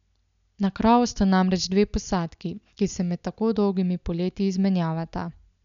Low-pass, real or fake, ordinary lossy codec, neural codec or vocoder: 7.2 kHz; real; none; none